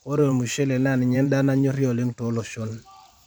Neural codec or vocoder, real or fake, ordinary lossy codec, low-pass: vocoder, 44.1 kHz, 128 mel bands every 512 samples, BigVGAN v2; fake; none; 19.8 kHz